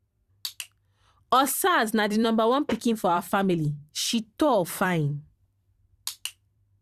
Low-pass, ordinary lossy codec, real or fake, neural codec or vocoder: 14.4 kHz; Opus, 64 kbps; fake; vocoder, 44.1 kHz, 128 mel bands every 256 samples, BigVGAN v2